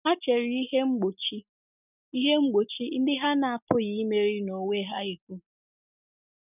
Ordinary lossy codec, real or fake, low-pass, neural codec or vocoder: none; real; 3.6 kHz; none